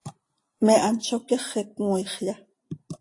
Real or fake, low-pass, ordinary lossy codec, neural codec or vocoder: real; 10.8 kHz; AAC, 48 kbps; none